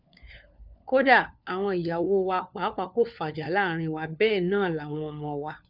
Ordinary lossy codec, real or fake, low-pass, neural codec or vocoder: none; fake; 5.4 kHz; codec, 16 kHz, 4 kbps, FunCodec, trained on LibriTTS, 50 frames a second